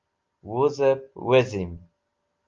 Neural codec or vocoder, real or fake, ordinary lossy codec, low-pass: none; real; Opus, 32 kbps; 7.2 kHz